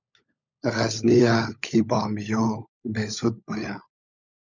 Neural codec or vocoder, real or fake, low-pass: codec, 16 kHz, 16 kbps, FunCodec, trained on LibriTTS, 50 frames a second; fake; 7.2 kHz